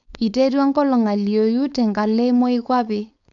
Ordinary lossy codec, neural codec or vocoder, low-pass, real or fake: none; codec, 16 kHz, 4.8 kbps, FACodec; 7.2 kHz; fake